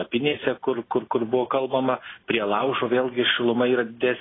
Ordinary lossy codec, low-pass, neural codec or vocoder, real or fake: AAC, 16 kbps; 7.2 kHz; none; real